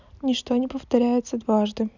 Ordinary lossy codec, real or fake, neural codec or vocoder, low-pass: none; real; none; 7.2 kHz